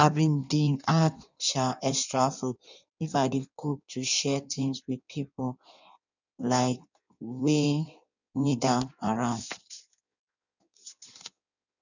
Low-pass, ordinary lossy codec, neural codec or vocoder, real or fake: 7.2 kHz; none; codec, 16 kHz in and 24 kHz out, 1.1 kbps, FireRedTTS-2 codec; fake